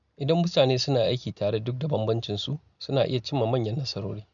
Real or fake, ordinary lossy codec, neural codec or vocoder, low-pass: real; none; none; 7.2 kHz